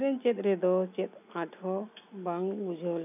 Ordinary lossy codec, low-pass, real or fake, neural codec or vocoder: none; 3.6 kHz; real; none